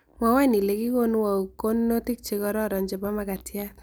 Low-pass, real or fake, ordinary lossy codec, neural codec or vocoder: none; real; none; none